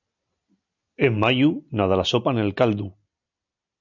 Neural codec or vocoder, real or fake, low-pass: none; real; 7.2 kHz